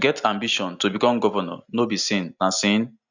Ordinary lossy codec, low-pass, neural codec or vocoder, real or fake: none; 7.2 kHz; none; real